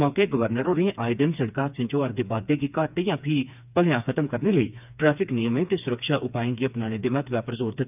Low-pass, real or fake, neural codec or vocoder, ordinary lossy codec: 3.6 kHz; fake; codec, 16 kHz, 4 kbps, FreqCodec, smaller model; none